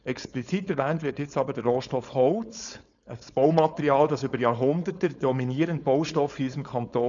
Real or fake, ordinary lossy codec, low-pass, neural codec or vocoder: fake; none; 7.2 kHz; codec, 16 kHz, 4.8 kbps, FACodec